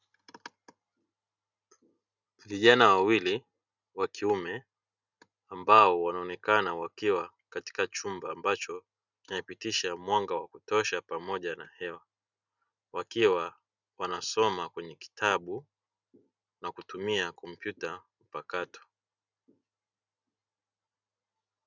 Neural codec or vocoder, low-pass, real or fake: none; 7.2 kHz; real